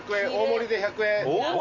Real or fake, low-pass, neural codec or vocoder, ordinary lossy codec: real; 7.2 kHz; none; none